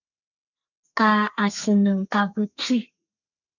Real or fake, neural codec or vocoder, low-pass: fake; codec, 32 kHz, 1.9 kbps, SNAC; 7.2 kHz